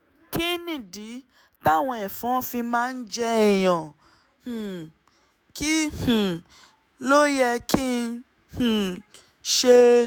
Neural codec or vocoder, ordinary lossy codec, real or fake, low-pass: autoencoder, 48 kHz, 128 numbers a frame, DAC-VAE, trained on Japanese speech; none; fake; none